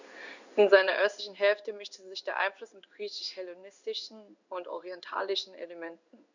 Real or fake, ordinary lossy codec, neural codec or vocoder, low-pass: fake; none; codec, 16 kHz in and 24 kHz out, 1 kbps, XY-Tokenizer; 7.2 kHz